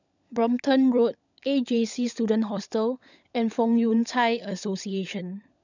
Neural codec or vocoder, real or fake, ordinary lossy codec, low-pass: codec, 16 kHz, 16 kbps, FunCodec, trained on LibriTTS, 50 frames a second; fake; none; 7.2 kHz